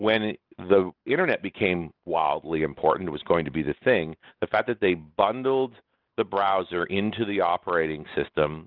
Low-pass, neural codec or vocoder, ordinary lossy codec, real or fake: 5.4 kHz; none; Opus, 24 kbps; real